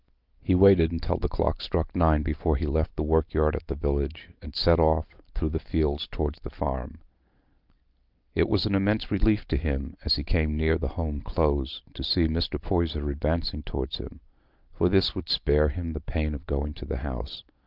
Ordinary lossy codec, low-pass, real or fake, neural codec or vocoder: Opus, 32 kbps; 5.4 kHz; real; none